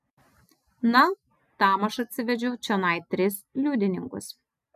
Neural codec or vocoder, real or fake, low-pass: none; real; 14.4 kHz